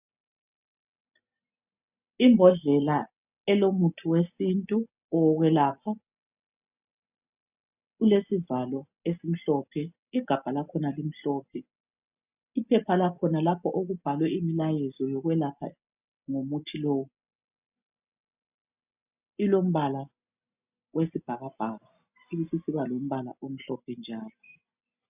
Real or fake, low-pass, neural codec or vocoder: real; 3.6 kHz; none